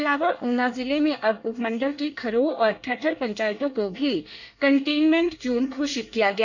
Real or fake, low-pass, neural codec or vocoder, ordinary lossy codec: fake; 7.2 kHz; codec, 24 kHz, 1 kbps, SNAC; none